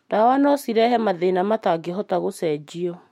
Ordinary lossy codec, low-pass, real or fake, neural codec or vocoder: MP3, 64 kbps; 19.8 kHz; fake; autoencoder, 48 kHz, 128 numbers a frame, DAC-VAE, trained on Japanese speech